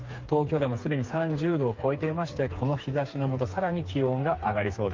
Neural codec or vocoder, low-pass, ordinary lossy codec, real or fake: codec, 16 kHz, 4 kbps, FreqCodec, smaller model; 7.2 kHz; Opus, 24 kbps; fake